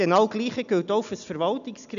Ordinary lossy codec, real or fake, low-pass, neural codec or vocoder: none; real; 7.2 kHz; none